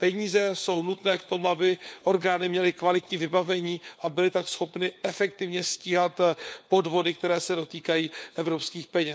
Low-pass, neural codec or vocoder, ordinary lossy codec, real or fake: none; codec, 16 kHz, 4 kbps, FunCodec, trained on LibriTTS, 50 frames a second; none; fake